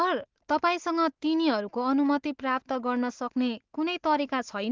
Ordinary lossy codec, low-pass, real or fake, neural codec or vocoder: Opus, 16 kbps; 7.2 kHz; real; none